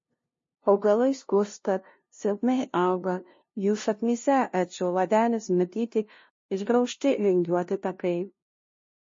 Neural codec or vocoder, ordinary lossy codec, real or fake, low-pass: codec, 16 kHz, 0.5 kbps, FunCodec, trained on LibriTTS, 25 frames a second; MP3, 32 kbps; fake; 7.2 kHz